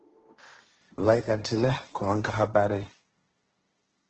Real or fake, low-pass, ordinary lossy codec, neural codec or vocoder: fake; 7.2 kHz; Opus, 16 kbps; codec, 16 kHz, 1.1 kbps, Voila-Tokenizer